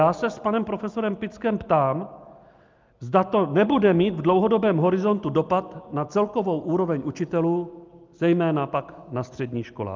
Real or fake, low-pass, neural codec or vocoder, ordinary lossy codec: real; 7.2 kHz; none; Opus, 24 kbps